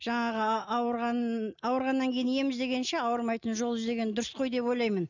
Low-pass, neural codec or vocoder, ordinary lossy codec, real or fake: 7.2 kHz; none; none; real